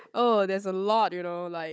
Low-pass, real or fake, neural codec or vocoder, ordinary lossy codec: none; fake; codec, 16 kHz, 16 kbps, FunCodec, trained on Chinese and English, 50 frames a second; none